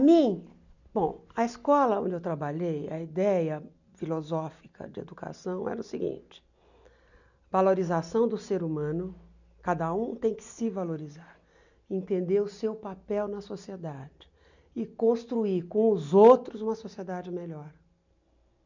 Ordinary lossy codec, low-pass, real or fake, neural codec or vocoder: none; 7.2 kHz; real; none